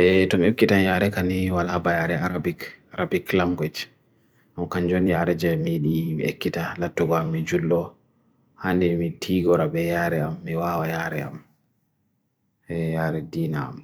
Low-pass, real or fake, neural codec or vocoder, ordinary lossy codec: none; fake; vocoder, 44.1 kHz, 128 mel bands every 256 samples, BigVGAN v2; none